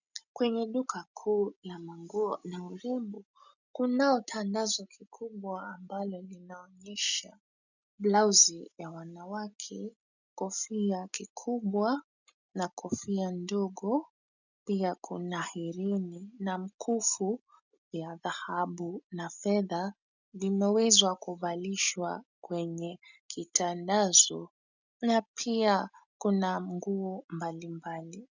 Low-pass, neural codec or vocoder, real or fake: 7.2 kHz; none; real